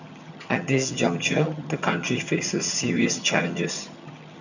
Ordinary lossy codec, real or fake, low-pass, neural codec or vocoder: none; fake; 7.2 kHz; vocoder, 22.05 kHz, 80 mel bands, HiFi-GAN